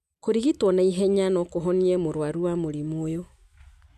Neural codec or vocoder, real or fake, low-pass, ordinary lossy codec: none; real; 14.4 kHz; none